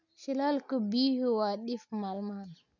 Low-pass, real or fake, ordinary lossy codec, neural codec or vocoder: 7.2 kHz; real; none; none